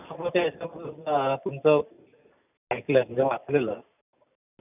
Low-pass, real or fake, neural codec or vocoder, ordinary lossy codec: 3.6 kHz; real; none; none